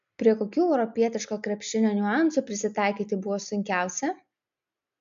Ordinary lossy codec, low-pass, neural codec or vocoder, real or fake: MP3, 64 kbps; 7.2 kHz; none; real